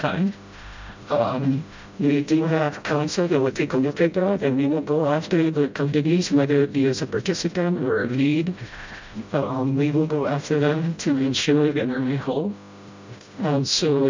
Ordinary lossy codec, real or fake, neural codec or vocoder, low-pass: MP3, 64 kbps; fake; codec, 16 kHz, 0.5 kbps, FreqCodec, smaller model; 7.2 kHz